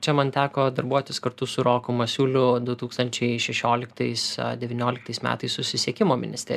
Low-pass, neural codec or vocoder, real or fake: 14.4 kHz; none; real